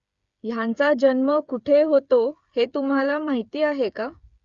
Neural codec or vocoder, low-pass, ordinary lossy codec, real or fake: codec, 16 kHz, 8 kbps, FreqCodec, smaller model; 7.2 kHz; Opus, 64 kbps; fake